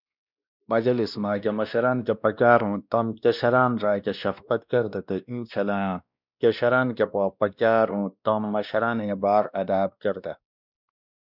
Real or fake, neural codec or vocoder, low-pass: fake; codec, 16 kHz, 1 kbps, X-Codec, WavLM features, trained on Multilingual LibriSpeech; 5.4 kHz